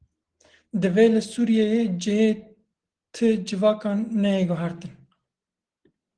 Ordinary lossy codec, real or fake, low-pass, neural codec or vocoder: Opus, 16 kbps; real; 9.9 kHz; none